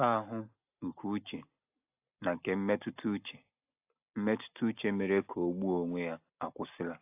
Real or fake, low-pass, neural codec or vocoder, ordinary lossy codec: real; 3.6 kHz; none; AAC, 32 kbps